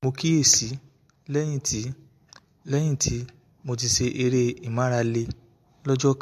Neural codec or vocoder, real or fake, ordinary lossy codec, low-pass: none; real; MP3, 64 kbps; 14.4 kHz